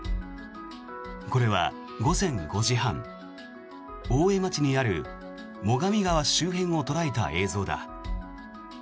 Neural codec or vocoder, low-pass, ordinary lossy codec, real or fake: none; none; none; real